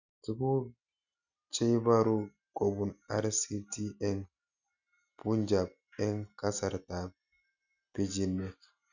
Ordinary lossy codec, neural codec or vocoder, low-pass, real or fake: none; none; 7.2 kHz; real